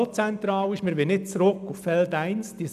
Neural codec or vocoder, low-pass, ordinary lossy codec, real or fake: none; 14.4 kHz; none; real